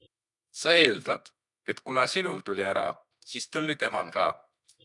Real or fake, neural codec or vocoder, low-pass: fake; codec, 24 kHz, 0.9 kbps, WavTokenizer, medium music audio release; 10.8 kHz